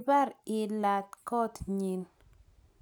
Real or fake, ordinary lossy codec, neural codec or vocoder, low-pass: real; none; none; none